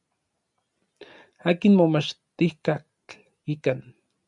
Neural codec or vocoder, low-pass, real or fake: none; 10.8 kHz; real